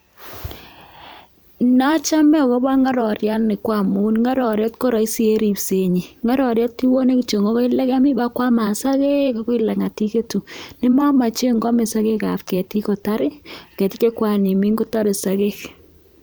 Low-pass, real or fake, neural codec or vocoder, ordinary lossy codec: none; fake; vocoder, 44.1 kHz, 128 mel bands every 512 samples, BigVGAN v2; none